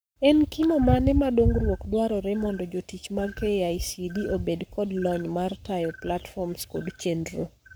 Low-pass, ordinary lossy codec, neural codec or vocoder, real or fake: none; none; codec, 44.1 kHz, 7.8 kbps, Pupu-Codec; fake